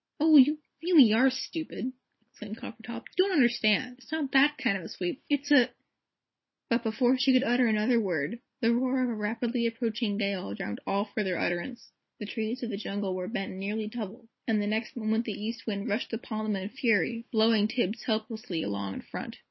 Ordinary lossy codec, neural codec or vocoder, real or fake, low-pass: MP3, 24 kbps; none; real; 7.2 kHz